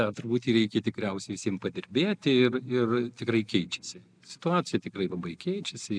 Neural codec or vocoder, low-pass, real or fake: none; 9.9 kHz; real